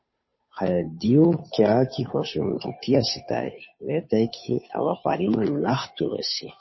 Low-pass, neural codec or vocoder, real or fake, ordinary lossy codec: 7.2 kHz; codec, 16 kHz, 2 kbps, FunCodec, trained on Chinese and English, 25 frames a second; fake; MP3, 24 kbps